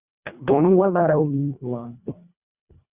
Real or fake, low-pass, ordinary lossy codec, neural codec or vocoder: fake; 3.6 kHz; Opus, 64 kbps; codec, 24 kHz, 1.5 kbps, HILCodec